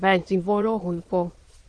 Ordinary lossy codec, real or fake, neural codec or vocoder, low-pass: Opus, 16 kbps; fake; autoencoder, 22.05 kHz, a latent of 192 numbers a frame, VITS, trained on many speakers; 9.9 kHz